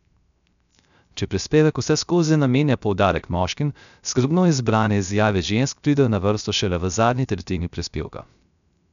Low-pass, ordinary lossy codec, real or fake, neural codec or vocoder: 7.2 kHz; none; fake; codec, 16 kHz, 0.3 kbps, FocalCodec